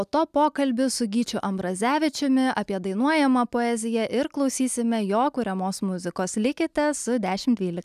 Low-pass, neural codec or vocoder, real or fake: 14.4 kHz; none; real